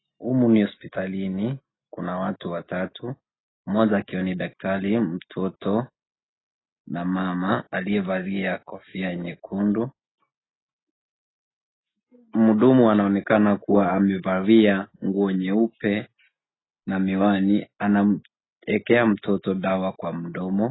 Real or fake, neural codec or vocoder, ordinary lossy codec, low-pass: real; none; AAC, 16 kbps; 7.2 kHz